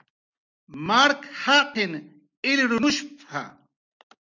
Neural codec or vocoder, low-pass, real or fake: none; 7.2 kHz; real